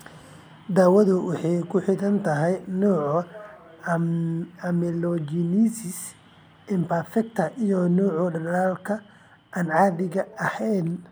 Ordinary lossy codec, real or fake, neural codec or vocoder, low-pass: none; real; none; none